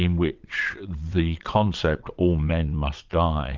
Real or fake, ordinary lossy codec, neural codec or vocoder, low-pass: fake; Opus, 24 kbps; vocoder, 22.05 kHz, 80 mel bands, WaveNeXt; 7.2 kHz